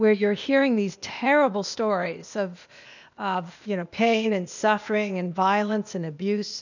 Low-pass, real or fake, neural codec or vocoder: 7.2 kHz; fake; codec, 16 kHz, 0.8 kbps, ZipCodec